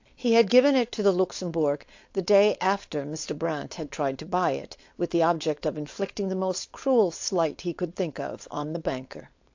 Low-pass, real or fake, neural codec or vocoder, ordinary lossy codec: 7.2 kHz; fake; codec, 16 kHz, 4.8 kbps, FACodec; AAC, 48 kbps